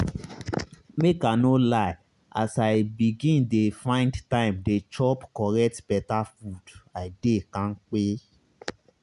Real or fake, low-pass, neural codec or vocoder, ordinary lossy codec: real; 10.8 kHz; none; none